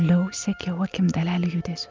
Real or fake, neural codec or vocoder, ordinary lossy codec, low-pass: real; none; Opus, 24 kbps; 7.2 kHz